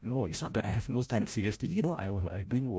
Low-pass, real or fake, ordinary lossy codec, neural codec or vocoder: none; fake; none; codec, 16 kHz, 0.5 kbps, FreqCodec, larger model